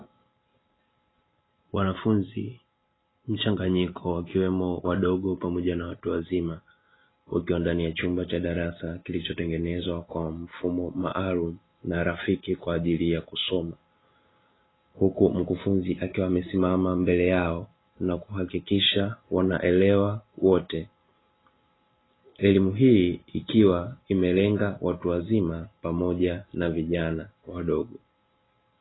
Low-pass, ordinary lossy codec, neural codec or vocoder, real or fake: 7.2 kHz; AAC, 16 kbps; none; real